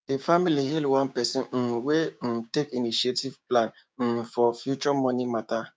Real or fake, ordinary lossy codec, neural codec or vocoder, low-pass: fake; none; codec, 16 kHz, 6 kbps, DAC; none